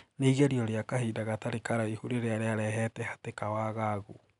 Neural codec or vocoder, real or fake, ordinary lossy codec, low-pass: none; real; none; 10.8 kHz